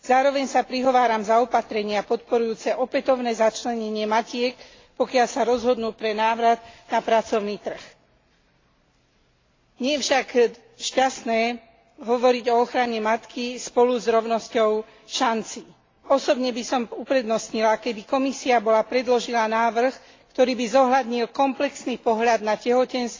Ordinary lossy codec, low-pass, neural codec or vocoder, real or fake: AAC, 32 kbps; 7.2 kHz; none; real